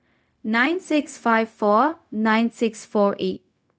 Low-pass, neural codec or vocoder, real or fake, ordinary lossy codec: none; codec, 16 kHz, 0.4 kbps, LongCat-Audio-Codec; fake; none